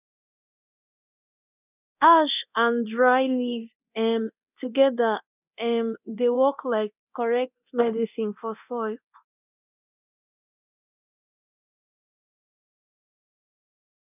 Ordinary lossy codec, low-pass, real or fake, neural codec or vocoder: none; 3.6 kHz; fake; codec, 24 kHz, 0.9 kbps, DualCodec